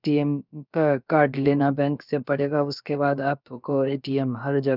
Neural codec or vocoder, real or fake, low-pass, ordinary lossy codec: codec, 16 kHz, about 1 kbps, DyCAST, with the encoder's durations; fake; 5.4 kHz; none